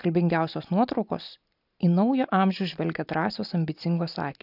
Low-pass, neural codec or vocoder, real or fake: 5.4 kHz; none; real